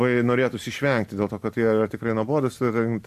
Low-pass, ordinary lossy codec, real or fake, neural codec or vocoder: 14.4 kHz; MP3, 64 kbps; real; none